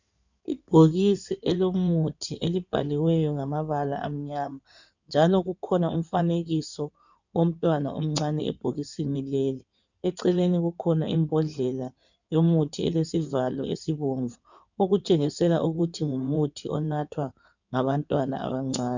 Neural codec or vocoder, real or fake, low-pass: codec, 16 kHz in and 24 kHz out, 2.2 kbps, FireRedTTS-2 codec; fake; 7.2 kHz